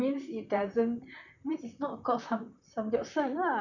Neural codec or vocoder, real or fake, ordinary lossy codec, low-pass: vocoder, 44.1 kHz, 128 mel bands, Pupu-Vocoder; fake; none; 7.2 kHz